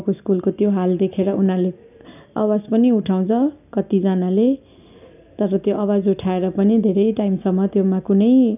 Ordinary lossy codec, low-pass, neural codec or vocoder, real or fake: none; 3.6 kHz; none; real